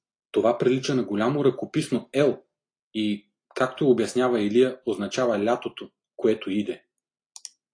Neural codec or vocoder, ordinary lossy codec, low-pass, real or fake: none; AAC, 48 kbps; 9.9 kHz; real